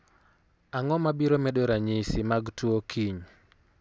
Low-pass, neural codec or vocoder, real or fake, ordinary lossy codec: none; none; real; none